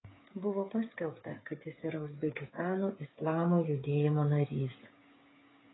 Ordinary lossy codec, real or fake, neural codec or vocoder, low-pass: AAC, 16 kbps; fake; codec, 16 kHz, 8 kbps, FreqCodec, smaller model; 7.2 kHz